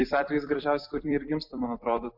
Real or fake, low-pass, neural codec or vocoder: fake; 5.4 kHz; vocoder, 44.1 kHz, 128 mel bands every 256 samples, BigVGAN v2